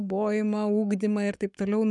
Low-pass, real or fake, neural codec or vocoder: 10.8 kHz; fake; codec, 44.1 kHz, 7.8 kbps, Pupu-Codec